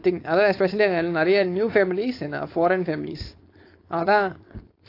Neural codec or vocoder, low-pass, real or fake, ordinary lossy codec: codec, 16 kHz, 4.8 kbps, FACodec; 5.4 kHz; fake; AAC, 32 kbps